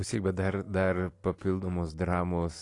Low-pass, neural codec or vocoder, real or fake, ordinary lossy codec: 10.8 kHz; none; real; AAC, 48 kbps